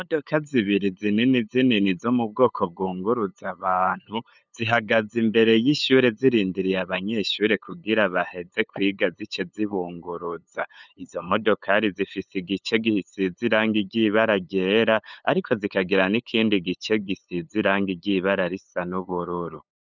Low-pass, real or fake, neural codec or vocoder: 7.2 kHz; fake; codec, 16 kHz, 8 kbps, FunCodec, trained on LibriTTS, 25 frames a second